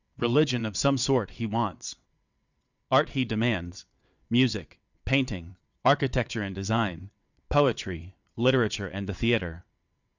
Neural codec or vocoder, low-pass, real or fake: vocoder, 22.05 kHz, 80 mel bands, WaveNeXt; 7.2 kHz; fake